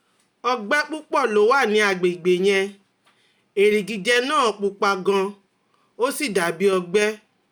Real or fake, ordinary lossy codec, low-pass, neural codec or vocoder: real; none; none; none